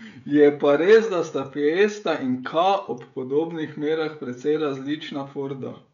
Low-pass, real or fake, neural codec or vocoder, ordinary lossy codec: 7.2 kHz; fake; codec, 16 kHz, 16 kbps, FreqCodec, smaller model; none